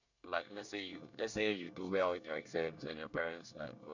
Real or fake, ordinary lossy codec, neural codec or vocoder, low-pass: fake; none; codec, 24 kHz, 1 kbps, SNAC; 7.2 kHz